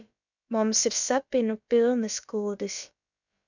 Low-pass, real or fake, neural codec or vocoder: 7.2 kHz; fake; codec, 16 kHz, about 1 kbps, DyCAST, with the encoder's durations